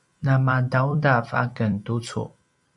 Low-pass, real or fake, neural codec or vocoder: 10.8 kHz; fake; vocoder, 44.1 kHz, 128 mel bands every 256 samples, BigVGAN v2